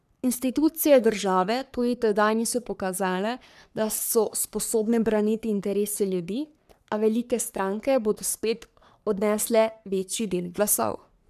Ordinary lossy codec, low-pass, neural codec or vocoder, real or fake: none; 14.4 kHz; codec, 44.1 kHz, 3.4 kbps, Pupu-Codec; fake